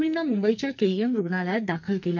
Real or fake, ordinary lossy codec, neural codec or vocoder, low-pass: fake; none; codec, 44.1 kHz, 2.6 kbps, SNAC; 7.2 kHz